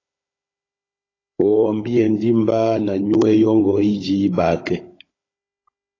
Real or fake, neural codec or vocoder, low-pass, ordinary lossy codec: fake; codec, 16 kHz, 16 kbps, FunCodec, trained on Chinese and English, 50 frames a second; 7.2 kHz; AAC, 32 kbps